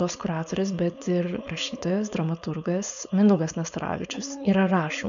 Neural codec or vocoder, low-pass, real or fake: codec, 16 kHz, 4.8 kbps, FACodec; 7.2 kHz; fake